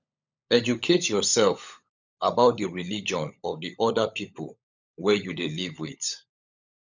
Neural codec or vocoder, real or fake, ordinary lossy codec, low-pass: codec, 16 kHz, 16 kbps, FunCodec, trained on LibriTTS, 50 frames a second; fake; none; 7.2 kHz